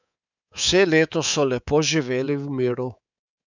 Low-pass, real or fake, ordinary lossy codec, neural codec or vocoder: 7.2 kHz; fake; none; codec, 24 kHz, 3.1 kbps, DualCodec